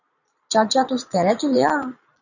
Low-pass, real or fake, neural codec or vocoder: 7.2 kHz; fake; vocoder, 22.05 kHz, 80 mel bands, Vocos